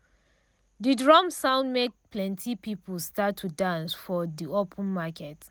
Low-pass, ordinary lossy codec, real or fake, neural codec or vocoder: none; none; real; none